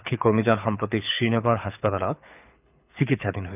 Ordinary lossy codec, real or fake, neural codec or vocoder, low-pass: none; fake; codec, 24 kHz, 6 kbps, HILCodec; 3.6 kHz